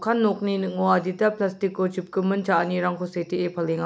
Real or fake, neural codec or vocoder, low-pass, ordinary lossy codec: real; none; none; none